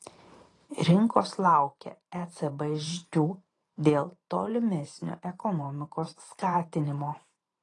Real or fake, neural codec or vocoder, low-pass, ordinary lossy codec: fake; vocoder, 44.1 kHz, 128 mel bands every 512 samples, BigVGAN v2; 10.8 kHz; AAC, 32 kbps